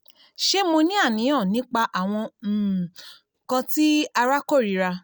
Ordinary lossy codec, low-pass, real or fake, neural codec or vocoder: none; none; real; none